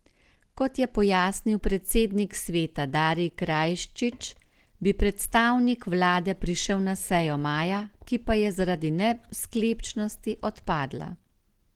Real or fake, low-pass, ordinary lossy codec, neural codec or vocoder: real; 19.8 kHz; Opus, 16 kbps; none